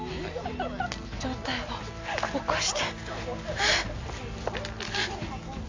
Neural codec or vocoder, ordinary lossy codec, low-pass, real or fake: none; MP3, 48 kbps; 7.2 kHz; real